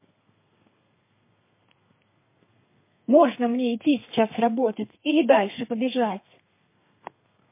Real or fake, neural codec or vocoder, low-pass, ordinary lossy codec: fake; codec, 32 kHz, 1.9 kbps, SNAC; 3.6 kHz; MP3, 24 kbps